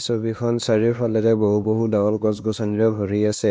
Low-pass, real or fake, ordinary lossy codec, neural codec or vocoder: none; fake; none; codec, 16 kHz, 2 kbps, X-Codec, WavLM features, trained on Multilingual LibriSpeech